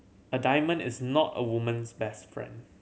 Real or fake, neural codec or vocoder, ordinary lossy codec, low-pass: real; none; none; none